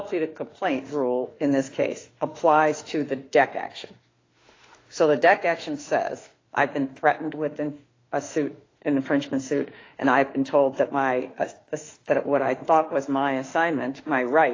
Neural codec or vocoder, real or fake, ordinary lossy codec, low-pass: autoencoder, 48 kHz, 32 numbers a frame, DAC-VAE, trained on Japanese speech; fake; AAC, 32 kbps; 7.2 kHz